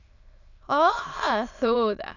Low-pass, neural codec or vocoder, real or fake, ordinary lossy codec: 7.2 kHz; autoencoder, 22.05 kHz, a latent of 192 numbers a frame, VITS, trained on many speakers; fake; none